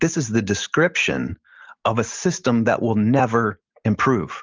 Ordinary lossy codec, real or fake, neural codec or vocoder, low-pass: Opus, 24 kbps; real; none; 7.2 kHz